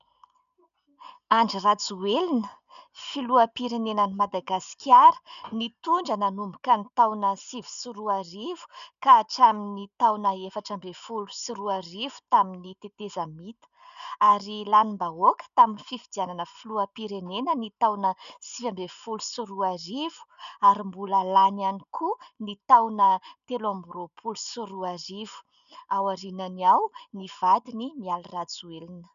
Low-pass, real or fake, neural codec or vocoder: 7.2 kHz; real; none